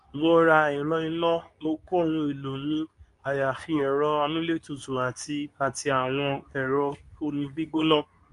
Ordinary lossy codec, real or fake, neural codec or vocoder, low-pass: MP3, 96 kbps; fake; codec, 24 kHz, 0.9 kbps, WavTokenizer, medium speech release version 2; 10.8 kHz